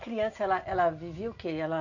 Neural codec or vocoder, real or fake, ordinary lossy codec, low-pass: none; real; none; 7.2 kHz